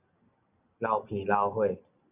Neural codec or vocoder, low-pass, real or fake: none; 3.6 kHz; real